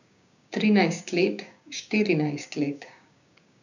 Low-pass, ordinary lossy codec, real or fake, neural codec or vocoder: 7.2 kHz; none; fake; codec, 16 kHz, 6 kbps, DAC